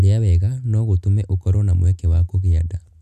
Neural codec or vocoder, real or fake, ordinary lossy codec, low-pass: none; real; none; 14.4 kHz